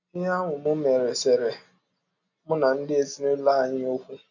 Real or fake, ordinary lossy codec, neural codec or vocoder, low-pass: real; none; none; 7.2 kHz